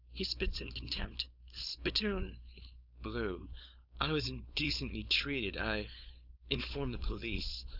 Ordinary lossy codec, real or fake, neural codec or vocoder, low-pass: Opus, 64 kbps; fake; codec, 16 kHz, 4.8 kbps, FACodec; 5.4 kHz